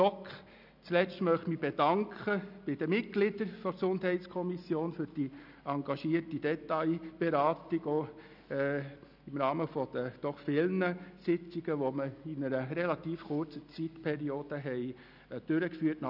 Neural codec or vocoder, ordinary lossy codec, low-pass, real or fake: none; none; 5.4 kHz; real